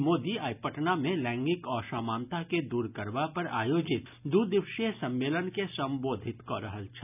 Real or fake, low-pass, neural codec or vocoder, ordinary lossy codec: real; 3.6 kHz; none; none